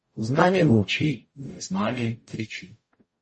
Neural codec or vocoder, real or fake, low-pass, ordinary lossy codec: codec, 44.1 kHz, 0.9 kbps, DAC; fake; 10.8 kHz; MP3, 32 kbps